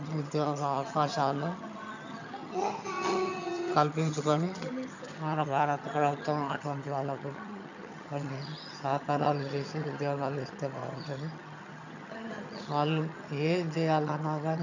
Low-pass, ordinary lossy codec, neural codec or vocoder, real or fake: 7.2 kHz; none; vocoder, 22.05 kHz, 80 mel bands, HiFi-GAN; fake